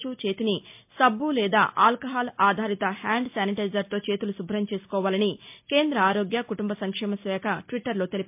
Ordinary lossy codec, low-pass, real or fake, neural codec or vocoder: MP3, 32 kbps; 3.6 kHz; real; none